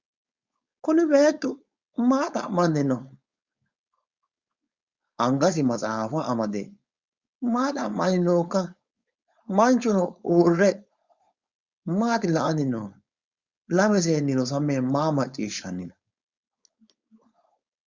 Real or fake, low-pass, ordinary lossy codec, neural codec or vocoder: fake; 7.2 kHz; Opus, 64 kbps; codec, 16 kHz, 4.8 kbps, FACodec